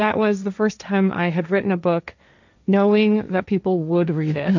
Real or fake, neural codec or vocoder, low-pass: fake; codec, 16 kHz, 1.1 kbps, Voila-Tokenizer; 7.2 kHz